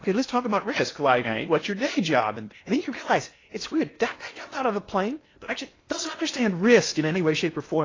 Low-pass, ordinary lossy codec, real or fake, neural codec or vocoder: 7.2 kHz; AAC, 32 kbps; fake; codec, 16 kHz in and 24 kHz out, 0.8 kbps, FocalCodec, streaming, 65536 codes